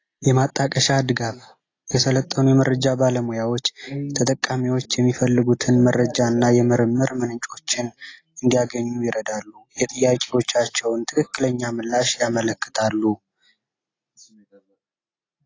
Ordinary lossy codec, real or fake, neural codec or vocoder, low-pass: AAC, 32 kbps; real; none; 7.2 kHz